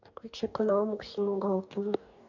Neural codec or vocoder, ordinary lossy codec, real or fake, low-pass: codec, 44.1 kHz, 2.6 kbps, DAC; none; fake; 7.2 kHz